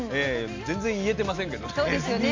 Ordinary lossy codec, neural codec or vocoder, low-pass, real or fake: MP3, 48 kbps; none; 7.2 kHz; real